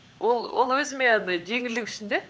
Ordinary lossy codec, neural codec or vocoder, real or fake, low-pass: none; codec, 16 kHz, 4 kbps, X-Codec, HuBERT features, trained on LibriSpeech; fake; none